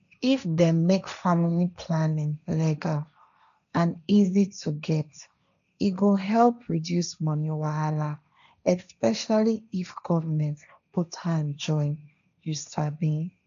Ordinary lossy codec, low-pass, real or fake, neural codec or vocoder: none; 7.2 kHz; fake; codec, 16 kHz, 1.1 kbps, Voila-Tokenizer